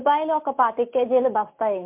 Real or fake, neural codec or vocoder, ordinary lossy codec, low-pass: real; none; MP3, 32 kbps; 3.6 kHz